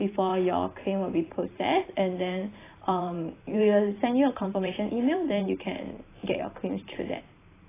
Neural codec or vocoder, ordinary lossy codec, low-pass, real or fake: vocoder, 44.1 kHz, 128 mel bands every 512 samples, BigVGAN v2; AAC, 16 kbps; 3.6 kHz; fake